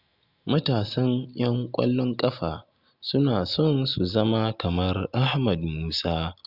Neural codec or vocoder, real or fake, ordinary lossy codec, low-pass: none; real; Opus, 64 kbps; 5.4 kHz